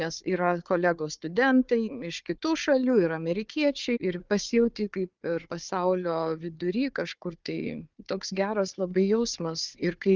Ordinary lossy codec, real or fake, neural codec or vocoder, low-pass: Opus, 24 kbps; fake; codec, 16 kHz, 4 kbps, FunCodec, trained on Chinese and English, 50 frames a second; 7.2 kHz